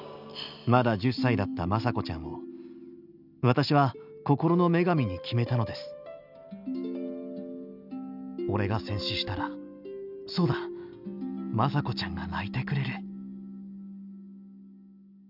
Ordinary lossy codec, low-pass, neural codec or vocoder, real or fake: none; 5.4 kHz; none; real